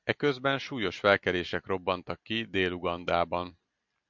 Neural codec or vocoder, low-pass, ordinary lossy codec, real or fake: none; 7.2 kHz; MP3, 64 kbps; real